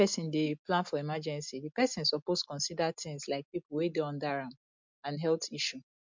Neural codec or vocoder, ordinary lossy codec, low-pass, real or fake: none; MP3, 64 kbps; 7.2 kHz; real